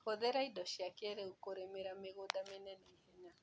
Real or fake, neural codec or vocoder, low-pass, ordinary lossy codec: real; none; none; none